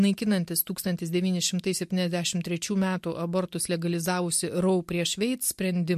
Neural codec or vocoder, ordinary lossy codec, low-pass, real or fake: none; MP3, 64 kbps; 14.4 kHz; real